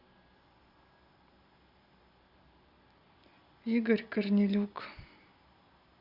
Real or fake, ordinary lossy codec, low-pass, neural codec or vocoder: real; Opus, 64 kbps; 5.4 kHz; none